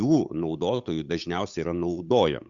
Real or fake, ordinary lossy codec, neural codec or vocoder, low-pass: fake; Opus, 64 kbps; codec, 16 kHz, 8 kbps, FunCodec, trained on Chinese and English, 25 frames a second; 7.2 kHz